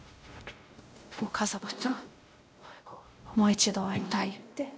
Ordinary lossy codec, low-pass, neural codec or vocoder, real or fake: none; none; codec, 16 kHz, 0.5 kbps, X-Codec, WavLM features, trained on Multilingual LibriSpeech; fake